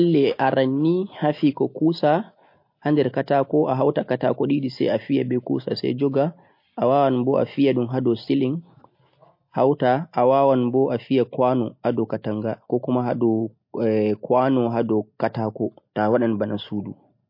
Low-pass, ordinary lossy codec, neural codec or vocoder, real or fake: 5.4 kHz; MP3, 32 kbps; none; real